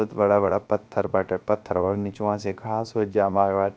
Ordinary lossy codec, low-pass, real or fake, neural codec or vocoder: none; none; fake; codec, 16 kHz, 0.7 kbps, FocalCodec